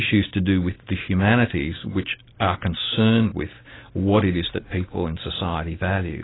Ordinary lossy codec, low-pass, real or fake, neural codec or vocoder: AAC, 16 kbps; 7.2 kHz; real; none